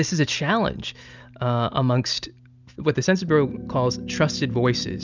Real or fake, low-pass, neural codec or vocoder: real; 7.2 kHz; none